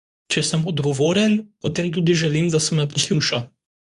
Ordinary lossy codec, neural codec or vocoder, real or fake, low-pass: none; codec, 24 kHz, 0.9 kbps, WavTokenizer, medium speech release version 2; fake; 10.8 kHz